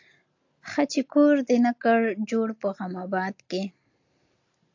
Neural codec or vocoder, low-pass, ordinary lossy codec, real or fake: none; 7.2 kHz; AAC, 48 kbps; real